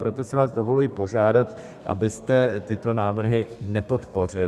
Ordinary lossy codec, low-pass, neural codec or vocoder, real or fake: Opus, 64 kbps; 14.4 kHz; codec, 32 kHz, 1.9 kbps, SNAC; fake